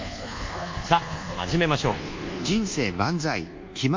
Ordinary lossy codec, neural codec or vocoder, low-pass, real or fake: MP3, 48 kbps; codec, 24 kHz, 1.2 kbps, DualCodec; 7.2 kHz; fake